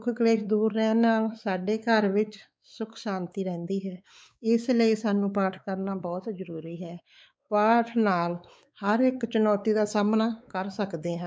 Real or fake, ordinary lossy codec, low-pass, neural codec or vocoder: fake; none; none; codec, 16 kHz, 4 kbps, X-Codec, WavLM features, trained on Multilingual LibriSpeech